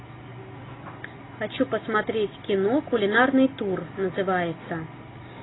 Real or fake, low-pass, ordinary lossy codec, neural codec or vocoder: real; 7.2 kHz; AAC, 16 kbps; none